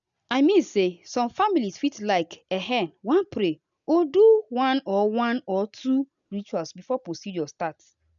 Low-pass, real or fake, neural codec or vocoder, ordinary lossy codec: 7.2 kHz; real; none; none